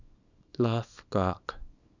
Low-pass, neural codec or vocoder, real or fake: 7.2 kHz; codec, 24 kHz, 0.9 kbps, WavTokenizer, small release; fake